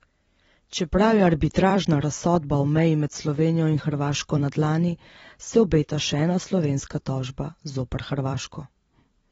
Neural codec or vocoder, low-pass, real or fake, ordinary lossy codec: vocoder, 44.1 kHz, 128 mel bands every 256 samples, BigVGAN v2; 19.8 kHz; fake; AAC, 24 kbps